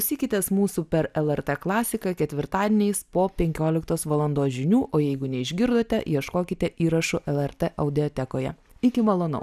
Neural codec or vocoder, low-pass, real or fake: none; 14.4 kHz; real